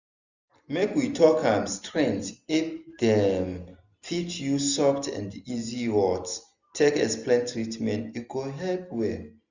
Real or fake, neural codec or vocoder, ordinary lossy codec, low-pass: real; none; none; 7.2 kHz